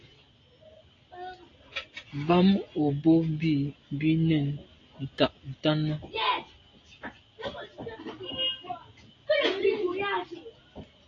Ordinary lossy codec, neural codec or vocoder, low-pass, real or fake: AAC, 32 kbps; none; 7.2 kHz; real